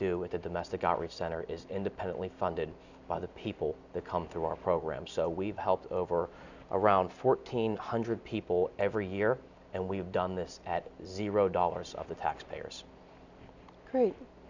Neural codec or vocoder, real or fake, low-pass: none; real; 7.2 kHz